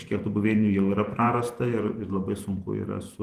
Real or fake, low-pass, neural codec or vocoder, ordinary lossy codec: fake; 14.4 kHz; vocoder, 48 kHz, 128 mel bands, Vocos; Opus, 24 kbps